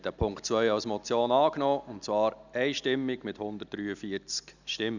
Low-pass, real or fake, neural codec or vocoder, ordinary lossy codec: 7.2 kHz; real; none; none